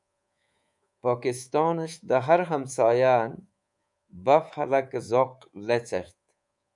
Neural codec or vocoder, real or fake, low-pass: codec, 24 kHz, 3.1 kbps, DualCodec; fake; 10.8 kHz